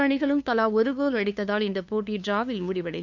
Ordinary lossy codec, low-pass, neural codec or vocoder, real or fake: none; 7.2 kHz; codec, 16 kHz, 2 kbps, FunCodec, trained on LibriTTS, 25 frames a second; fake